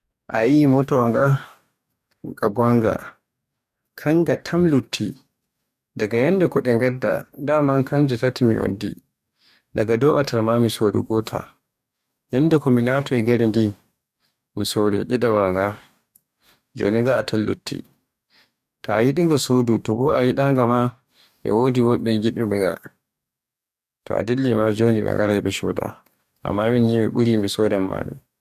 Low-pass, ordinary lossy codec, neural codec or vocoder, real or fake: 14.4 kHz; none; codec, 44.1 kHz, 2.6 kbps, DAC; fake